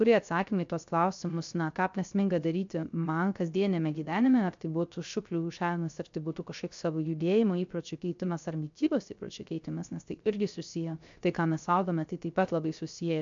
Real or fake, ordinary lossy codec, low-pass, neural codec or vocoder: fake; MP3, 64 kbps; 7.2 kHz; codec, 16 kHz, 0.3 kbps, FocalCodec